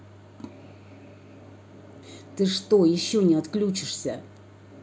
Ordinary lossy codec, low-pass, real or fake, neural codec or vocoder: none; none; real; none